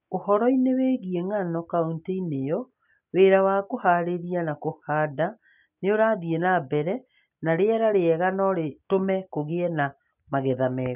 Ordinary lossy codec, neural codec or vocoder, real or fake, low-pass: none; none; real; 3.6 kHz